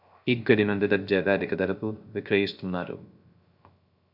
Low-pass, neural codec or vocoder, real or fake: 5.4 kHz; codec, 16 kHz, 0.3 kbps, FocalCodec; fake